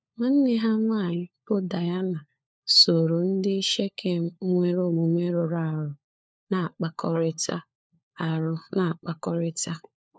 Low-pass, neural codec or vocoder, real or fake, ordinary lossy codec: none; codec, 16 kHz, 4 kbps, FunCodec, trained on LibriTTS, 50 frames a second; fake; none